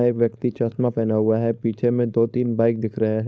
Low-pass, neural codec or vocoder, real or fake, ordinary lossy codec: none; codec, 16 kHz, 4.8 kbps, FACodec; fake; none